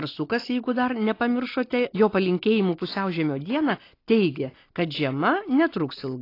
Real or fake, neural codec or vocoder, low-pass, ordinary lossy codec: real; none; 5.4 kHz; AAC, 32 kbps